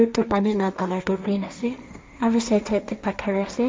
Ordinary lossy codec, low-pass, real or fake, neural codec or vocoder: none; none; fake; codec, 16 kHz, 1.1 kbps, Voila-Tokenizer